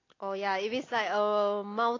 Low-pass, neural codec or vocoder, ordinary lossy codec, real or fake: 7.2 kHz; none; AAC, 32 kbps; real